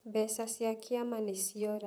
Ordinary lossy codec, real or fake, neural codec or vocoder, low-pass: none; fake; vocoder, 44.1 kHz, 128 mel bands, Pupu-Vocoder; none